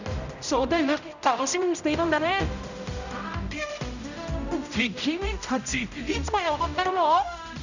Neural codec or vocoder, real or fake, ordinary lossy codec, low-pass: codec, 16 kHz, 0.5 kbps, X-Codec, HuBERT features, trained on general audio; fake; none; 7.2 kHz